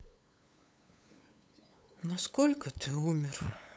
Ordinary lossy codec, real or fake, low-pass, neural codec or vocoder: none; fake; none; codec, 16 kHz, 8 kbps, FunCodec, trained on LibriTTS, 25 frames a second